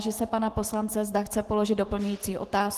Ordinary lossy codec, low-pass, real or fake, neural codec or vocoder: Opus, 16 kbps; 14.4 kHz; fake; autoencoder, 48 kHz, 128 numbers a frame, DAC-VAE, trained on Japanese speech